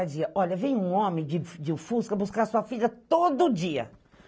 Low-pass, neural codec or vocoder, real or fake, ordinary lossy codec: none; none; real; none